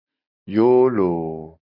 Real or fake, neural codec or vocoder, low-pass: real; none; 5.4 kHz